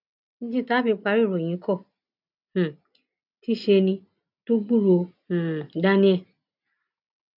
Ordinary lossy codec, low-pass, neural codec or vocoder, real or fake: none; 5.4 kHz; none; real